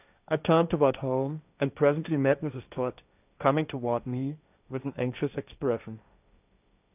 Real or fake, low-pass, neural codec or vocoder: fake; 3.6 kHz; codec, 16 kHz, 1.1 kbps, Voila-Tokenizer